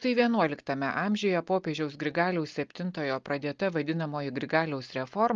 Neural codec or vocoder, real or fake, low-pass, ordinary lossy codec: none; real; 7.2 kHz; Opus, 24 kbps